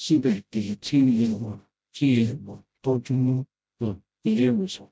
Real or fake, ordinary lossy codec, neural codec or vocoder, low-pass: fake; none; codec, 16 kHz, 0.5 kbps, FreqCodec, smaller model; none